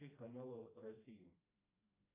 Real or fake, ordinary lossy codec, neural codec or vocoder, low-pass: fake; AAC, 24 kbps; codec, 16 kHz, 1 kbps, FreqCodec, smaller model; 3.6 kHz